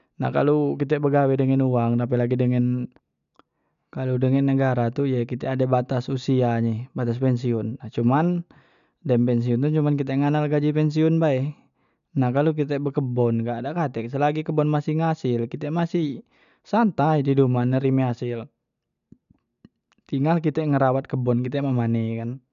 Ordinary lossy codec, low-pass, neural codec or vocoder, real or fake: MP3, 96 kbps; 7.2 kHz; none; real